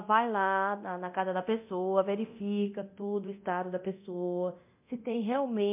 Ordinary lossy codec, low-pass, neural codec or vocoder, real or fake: MP3, 32 kbps; 3.6 kHz; codec, 24 kHz, 0.9 kbps, DualCodec; fake